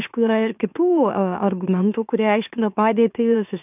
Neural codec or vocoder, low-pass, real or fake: autoencoder, 44.1 kHz, a latent of 192 numbers a frame, MeloTTS; 3.6 kHz; fake